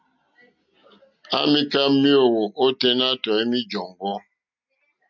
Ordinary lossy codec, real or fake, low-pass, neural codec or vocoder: MP3, 64 kbps; real; 7.2 kHz; none